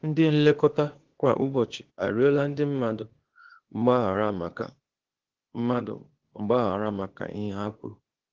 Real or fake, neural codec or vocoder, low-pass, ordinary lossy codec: fake; codec, 16 kHz, 0.8 kbps, ZipCodec; 7.2 kHz; Opus, 16 kbps